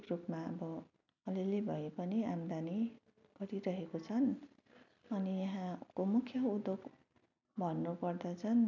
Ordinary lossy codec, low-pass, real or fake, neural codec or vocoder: none; 7.2 kHz; real; none